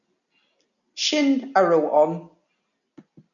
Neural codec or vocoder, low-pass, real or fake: none; 7.2 kHz; real